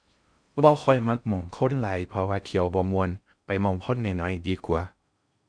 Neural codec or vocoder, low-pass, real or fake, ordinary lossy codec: codec, 16 kHz in and 24 kHz out, 0.6 kbps, FocalCodec, streaming, 4096 codes; 9.9 kHz; fake; none